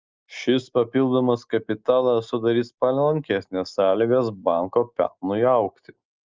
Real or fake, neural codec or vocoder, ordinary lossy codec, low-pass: real; none; Opus, 24 kbps; 7.2 kHz